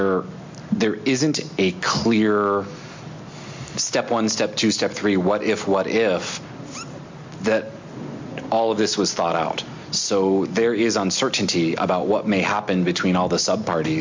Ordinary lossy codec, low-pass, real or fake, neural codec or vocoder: MP3, 48 kbps; 7.2 kHz; real; none